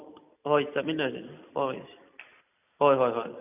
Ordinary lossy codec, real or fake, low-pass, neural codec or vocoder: none; real; 3.6 kHz; none